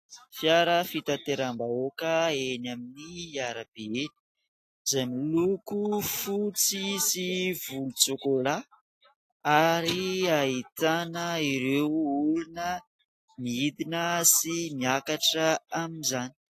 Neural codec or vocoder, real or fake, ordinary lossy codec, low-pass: none; real; AAC, 48 kbps; 14.4 kHz